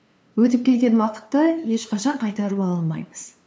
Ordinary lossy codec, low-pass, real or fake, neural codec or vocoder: none; none; fake; codec, 16 kHz, 2 kbps, FunCodec, trained on LibriTTS, 25 frames a second